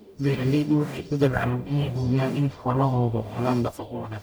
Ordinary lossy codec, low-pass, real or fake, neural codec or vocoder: none; none; fake; codec, 44.1 kHz, 0.9 kbps, DAC